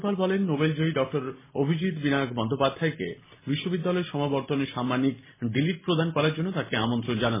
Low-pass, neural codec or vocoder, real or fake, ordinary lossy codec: 3.6 kHz; none; real; MP3, 16 kbps